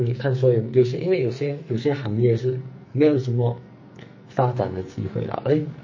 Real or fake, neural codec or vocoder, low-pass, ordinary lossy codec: fake; codec, 44.1 kHz, 2.6 kbps, SNAC; 7.2 kHz; MP3, 32 kbps